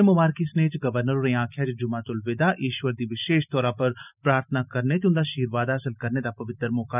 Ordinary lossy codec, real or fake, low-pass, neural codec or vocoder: none; real; 3.6 kHz; none